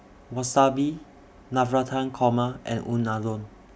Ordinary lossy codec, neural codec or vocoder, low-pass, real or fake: none; none; none; real